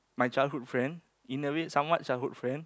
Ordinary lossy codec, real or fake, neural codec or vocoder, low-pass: none; real; none; none